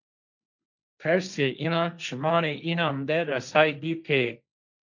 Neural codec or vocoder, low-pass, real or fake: codec, 16 kHz, 1.1 kbps, Voila-Tokenizer; 7.2 kHz; fake